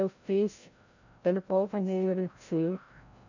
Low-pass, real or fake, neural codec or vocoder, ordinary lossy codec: 7.2 kHz; fake; codec, 16 kHz, 0.5 kbps, FreqCodec, larger model; none